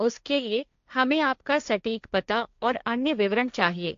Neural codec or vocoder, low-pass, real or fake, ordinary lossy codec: codec, 16 kHz, 1.1 kbps, Voila-Tokenizer; 7.2 kHz; fake; AAC, 64 kbps